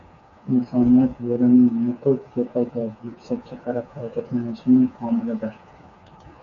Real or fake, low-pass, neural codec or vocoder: fake; 7.2 kHz; codec, 16 kHz, 4 kbps, FreqCodec, smaller model